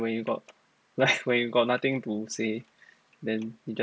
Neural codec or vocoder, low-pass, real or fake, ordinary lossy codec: none; none; real; none